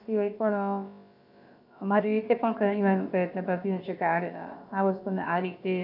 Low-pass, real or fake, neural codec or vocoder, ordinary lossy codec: 5.4 kHz; fake; codec, 16 kHz, about 1 kbps, DyCAST, with the encoder's durations; none